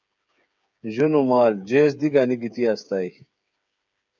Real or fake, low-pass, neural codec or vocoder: fake; 7.2 kHz; codec, 16 kHz, 8 kbps, FreqCodec, smaller model